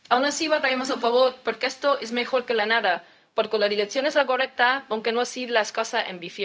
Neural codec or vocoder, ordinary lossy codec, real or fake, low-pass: codec, 16 kHz, 0.4 kbps, LongCat-Audio-Codec; none; fake; none